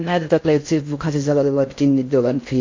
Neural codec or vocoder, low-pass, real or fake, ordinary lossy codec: codec, 16 kHz in and 24 kHz out, 0.6 kbps, FocalCodec, streaming, 4096 codes; 7.2 kHz; fake; MP3, 48 kbps